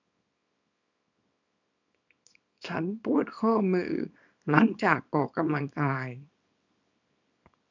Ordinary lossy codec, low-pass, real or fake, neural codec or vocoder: none; 7.2 kHz; fake; codec, 24 kHz, 0.9 kbps, WavTokenizer, small release